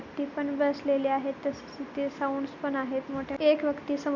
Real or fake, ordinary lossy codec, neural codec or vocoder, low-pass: real; none; none; 7.2 kHz